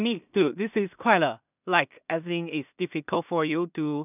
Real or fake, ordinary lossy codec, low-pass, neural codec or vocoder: fake; none; 3.6 kHz; codec, 16 kHz in and 24 kHz out, 0.4 kbps, LongCat-Audio-Codec, two codebook decoder